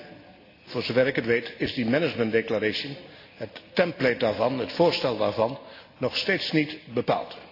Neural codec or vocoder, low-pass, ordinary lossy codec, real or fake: none; 5.4 kHz; AAC, 32 kbps; real